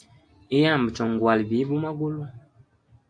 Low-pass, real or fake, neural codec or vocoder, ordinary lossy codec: 9.9 kHz; real; none; AAC, 64 kbps